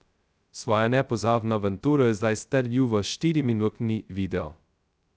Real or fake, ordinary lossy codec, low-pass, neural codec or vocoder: fake; none; none; codec, 16 kHz, 0.2 kbps, FocalCodec